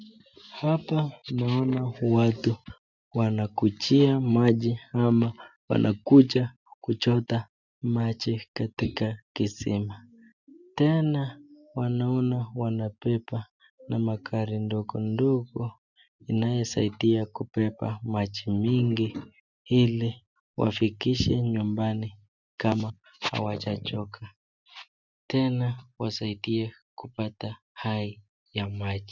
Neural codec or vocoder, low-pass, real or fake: none; 7.2 kHz; real